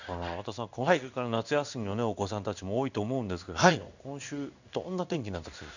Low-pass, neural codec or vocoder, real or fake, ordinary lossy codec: 7.2 kHz; codec, 16 kHz in and 24 kHz out, 1 kbps, XY-Tokenizer; fake; none